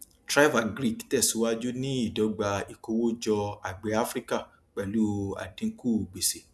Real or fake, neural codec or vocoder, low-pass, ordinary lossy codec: real; none; none; none